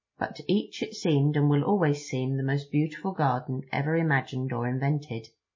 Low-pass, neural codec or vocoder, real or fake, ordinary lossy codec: 7.2 kHz; none; real; MP3, 32 kbps